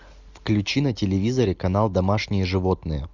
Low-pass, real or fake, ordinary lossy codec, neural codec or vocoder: 7.2 kHz; real; Opus, 64 kbps; none